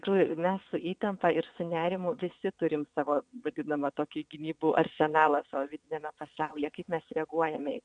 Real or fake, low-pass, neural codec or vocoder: fake; 9.9 kHz; vocoder, 22.05 kHz, 80 mel bands, WaveNeXt